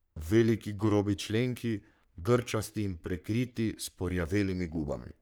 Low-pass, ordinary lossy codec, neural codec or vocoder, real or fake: none; none; codec, 44.1 kHz, 3.4 kbps, Pupu-Codec; fake